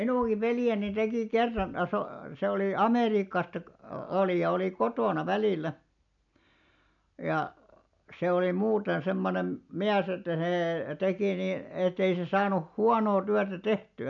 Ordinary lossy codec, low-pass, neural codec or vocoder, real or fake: none; 7.2 kHz; none; real